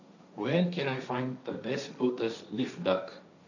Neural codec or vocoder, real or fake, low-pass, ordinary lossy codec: codec, 16 kHz, 1.1 kbps, Voila-Tokenizer; fake; 7.2 kHz; none